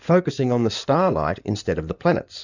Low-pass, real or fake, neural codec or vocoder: 7.2 kHz; fake; codec, 16 kHz in and 24 kHz out, 2.2 kbps, FireRedTTS-2 codec